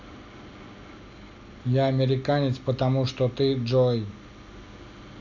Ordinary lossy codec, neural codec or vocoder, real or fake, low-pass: none; none; real; 7.2 kHz